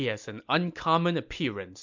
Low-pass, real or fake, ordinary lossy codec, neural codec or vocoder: 7.2 kHz; real; MP3, 64 kbps; none